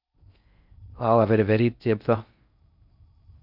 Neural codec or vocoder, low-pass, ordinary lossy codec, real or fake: codec, 16 kHz in and 24 kHz out, 0.6 kbps, FocalCodec, streaming, 4096 codes; 5.4 kHz; none; fake